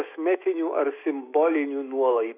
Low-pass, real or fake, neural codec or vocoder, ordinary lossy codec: 3.6 kHz; fake; autoencoder, 48 kHz, 128 numbers a frame, DAC-VAE, trained on Japanese speech; AAC, 24 kbps